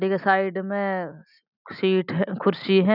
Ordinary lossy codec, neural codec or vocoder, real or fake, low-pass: none; none; real; 5.4 kHz